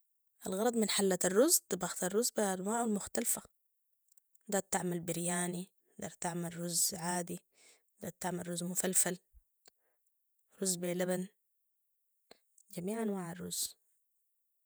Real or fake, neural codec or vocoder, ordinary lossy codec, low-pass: fake; vocoder, 48 kHz, 128 mel bands, Vocos; none; none